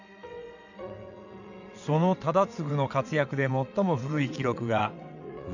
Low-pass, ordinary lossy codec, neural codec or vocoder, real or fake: 7.2 kHz; none; vocoder, 22.05 kHz, 80 mel bands, WaveNeXt; fake